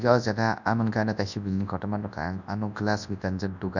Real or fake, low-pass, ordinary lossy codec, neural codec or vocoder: fake; 7.2 kHz; none; codec, 24 kHz, 0.9 kbps, WavTokenizer, large speech release